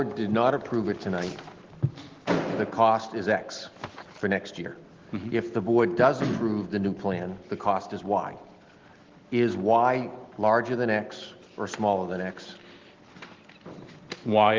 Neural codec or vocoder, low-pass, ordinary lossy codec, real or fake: none; 7.2 kHz; Opus, 16 kbps; real